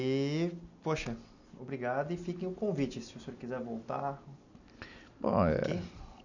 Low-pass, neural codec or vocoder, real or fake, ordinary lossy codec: 7.2 kHz; none; real; none